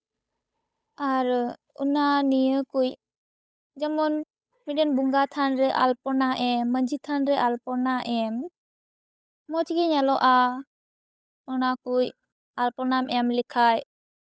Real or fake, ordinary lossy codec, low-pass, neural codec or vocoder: fake; none; none; codec, 16 kHz, 8 kbps, FunCodec, trained on Chinese and English, 25 frames a second